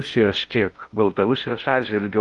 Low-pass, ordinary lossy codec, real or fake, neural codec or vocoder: 10.8 kHz; Opus, 32 kbps; fake; codec, 16 kHz in and 24 kHz out, 0.6 kbps, FocalCodec, streaming, 2048 codes